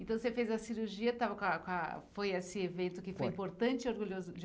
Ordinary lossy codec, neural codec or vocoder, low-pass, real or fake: none; none; none; real